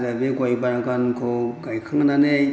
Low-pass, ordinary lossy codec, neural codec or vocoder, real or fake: none; none; none; real